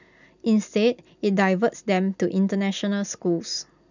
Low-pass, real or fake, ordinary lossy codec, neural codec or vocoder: 7.2 kHz; real; none; none